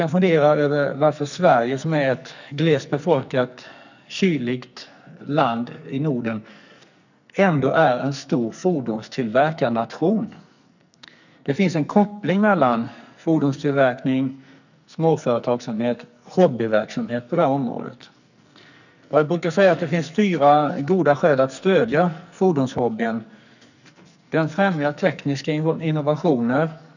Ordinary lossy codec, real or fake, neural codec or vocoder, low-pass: none; fake; codec, 44.1 kHz, 2.6 kbps, SNAC; 7.2 kHz